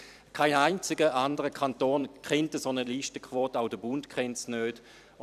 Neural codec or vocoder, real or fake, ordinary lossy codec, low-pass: vocoder, 44.1 kHz, 128 mel bands every 256 samples, BigVGAN v2; fake; none; 14.4 kHz